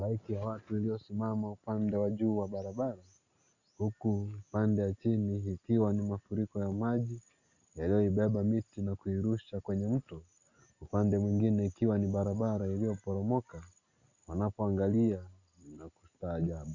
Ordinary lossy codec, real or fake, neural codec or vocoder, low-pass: Opus, 64 kbps; real; none; 7.2 kHz